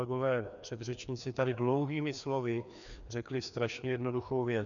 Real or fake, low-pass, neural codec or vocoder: fake; 7.2 kHz; codec, 16 kHz, 2 kbps, FreqCodec, larger model